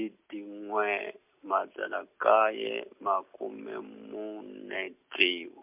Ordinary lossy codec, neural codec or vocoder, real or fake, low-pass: MP3, 32 kbps; none; real; 3.6 kHz